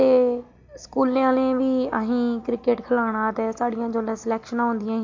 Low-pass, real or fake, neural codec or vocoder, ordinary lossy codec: 7.2 kHz; real; none; MP3, 48 kbps